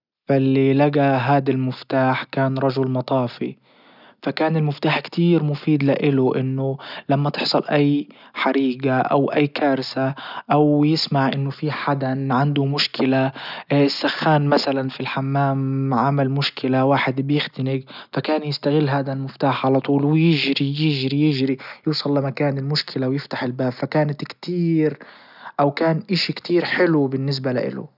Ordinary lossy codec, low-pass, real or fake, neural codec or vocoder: none; 5.4 kHz; real; none